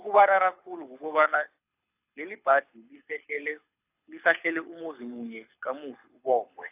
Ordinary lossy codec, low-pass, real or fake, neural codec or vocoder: AAC, 32 kbps; 3.6 kHz; fake; codec, 24 kHz, 6 kbps, HILCodec